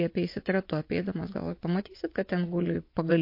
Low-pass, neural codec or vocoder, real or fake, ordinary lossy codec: 5.4 kHz; vocoder, 24 kHz, 100 mel bands, Vocos; fake; MP3, 32 kbps